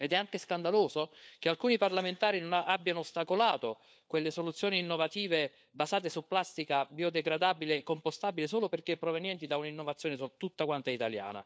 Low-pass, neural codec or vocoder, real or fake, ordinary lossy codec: none; codec, 16 kHz, 4 kbps, FunCodec, trained on LibriTTS, 50 frames a second; fake; none